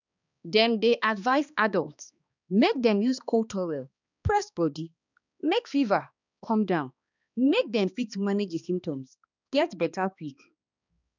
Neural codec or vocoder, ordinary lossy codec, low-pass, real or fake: codec, 16 kHz, 2 kbps, X-Codec, HuBERT features, trained on balanced general audio; none; 7.2 kHz; fake